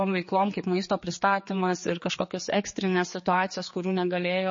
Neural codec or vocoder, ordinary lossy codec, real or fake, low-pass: codec, 16 kHz, 4 kbps, X-Codec, HuBERT features, trained on general audio; MP3, 32 kbps; fake; 7.2 kHz